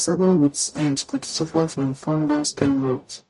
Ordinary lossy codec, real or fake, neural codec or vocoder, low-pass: MP3, 48 kbps; fake; codec, 44.1 kHz, 0.9 kbps, DAC; 14.4 kHz